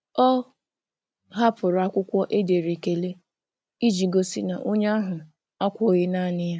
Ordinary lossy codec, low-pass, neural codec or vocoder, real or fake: none; none; none; real